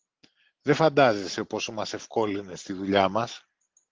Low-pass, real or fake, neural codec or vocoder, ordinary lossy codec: 7.2 kHz; real; none; Opus, 16 kbps